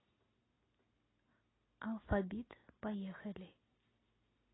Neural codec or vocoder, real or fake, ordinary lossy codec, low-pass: none; real; AAC, 16 kbps; 7.2 kHz